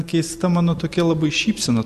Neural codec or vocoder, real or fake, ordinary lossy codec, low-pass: none; real; AAC, 96 kbps; 14.4 kHz